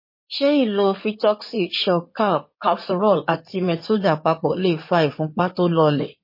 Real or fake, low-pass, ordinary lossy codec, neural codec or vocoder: fake; 5.4 kHz; MP3, 24 kbps; codec, 16 kHz in and 24 kHz out, 2.2 kbps, FireRedTTS-2 codec